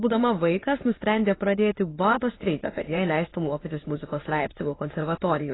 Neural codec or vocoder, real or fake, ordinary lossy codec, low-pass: autoencoder, 22.05 kHz, a latent of 192 numbers a frame, VITS, trained on many speakers; fake; AAC, 16 kbps; 7.2 kHz